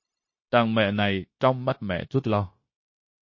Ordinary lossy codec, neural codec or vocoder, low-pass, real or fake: MP3, 32 kbps; codec, 16 kHz, 0.9 kbps, LongCat-Audio-Codec; 7.2 kHz; fake